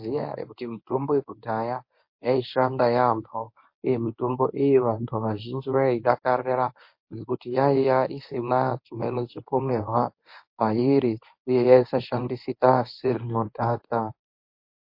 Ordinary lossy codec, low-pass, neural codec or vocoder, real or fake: MP3, 32 kbps; 5.4 kHz; codec, 24 kHz, 0.9 kbps, WavTokenizer, medium speech release version 1; fake